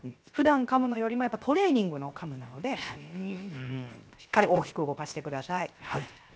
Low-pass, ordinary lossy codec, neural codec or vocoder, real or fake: none; none; codec, 16 kHz, 0.7 kbps, FocalCodec; fake